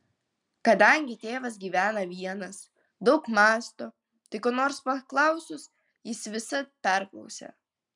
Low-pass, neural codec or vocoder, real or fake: 10.8 kHz; none; real